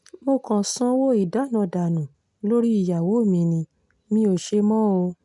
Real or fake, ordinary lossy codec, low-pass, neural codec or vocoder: real; none; 10.8 kHz; none